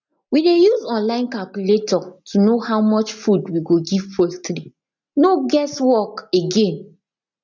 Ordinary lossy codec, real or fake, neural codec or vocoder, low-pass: none; real; none; 7.2 kHz